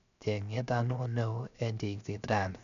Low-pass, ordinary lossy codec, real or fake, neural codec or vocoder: 7.2 kHz; none; fake; codec, 16 kHz, 0.7 kbps, FocalCodec